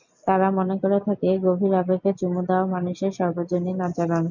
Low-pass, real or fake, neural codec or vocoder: 7.2 kHz; real; none